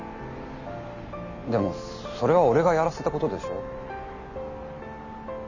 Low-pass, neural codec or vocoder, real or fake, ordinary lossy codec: 7.2 kHz; none; real; none